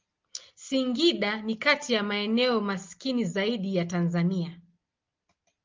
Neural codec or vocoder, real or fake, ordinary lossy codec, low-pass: none; real; Opus, 32 kbps; 7.2 kHz